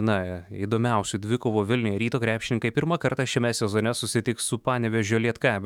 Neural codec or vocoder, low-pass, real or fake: autoencoder, 48 kHz, 128 numbers a frame, DAC-VAE, trained on Japanese speech; 19.8 kHz; fake